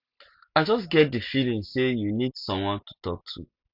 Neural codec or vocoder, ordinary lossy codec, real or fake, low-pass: none; Opus, 64 kbps; real; 5.4 kHz